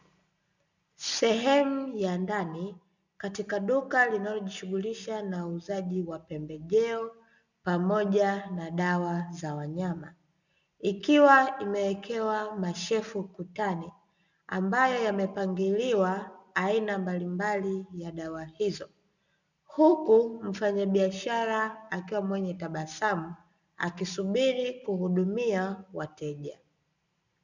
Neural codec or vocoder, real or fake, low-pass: none; real; 7.2 kHz